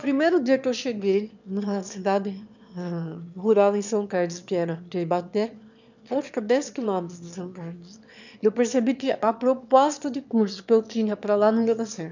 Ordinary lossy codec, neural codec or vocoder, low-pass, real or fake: none; autoencoder, 22.05 kHz, a latent of 192 numbers a frame, VITS, trained on one speaker; 7.2 kHz; fake